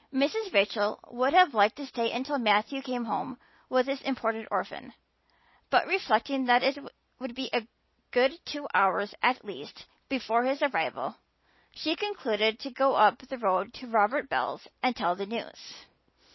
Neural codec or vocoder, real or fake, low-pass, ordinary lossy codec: none; real; 7.2 kHz; MP3, 24 kbps